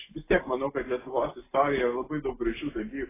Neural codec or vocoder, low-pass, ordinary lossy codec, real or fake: none; 3.6 kHz; AAC, 16 kbps; real